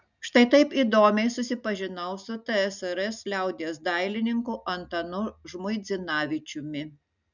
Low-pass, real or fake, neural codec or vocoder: 7.2 kHz; real; none